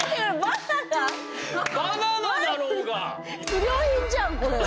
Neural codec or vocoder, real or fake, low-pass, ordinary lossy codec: none; real; none; none